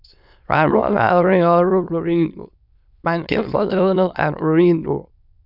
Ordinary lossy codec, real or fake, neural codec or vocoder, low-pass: none; fake; autoencoder, 22.05 kHz, a latent of 192 numbers a frame, VITS, trained on many speakers; 5.4 kHz